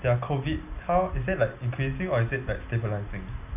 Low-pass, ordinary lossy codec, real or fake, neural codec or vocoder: 3.6 kHz; none; real; none